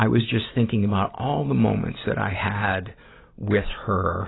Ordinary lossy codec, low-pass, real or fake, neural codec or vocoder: AAC, 16 kbps; 7.2 kHz; real; none